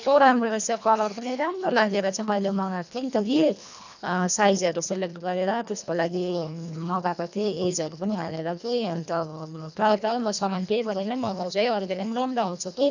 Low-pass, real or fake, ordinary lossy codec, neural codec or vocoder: 7.2 kHz; fake; none; codec, 24 kHz, 1.5 kbps, HILCodec